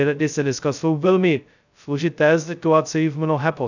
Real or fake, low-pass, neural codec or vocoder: fake; 7.2 kHz; codec, 16 kHz, 0.2 kbps, FocalCodec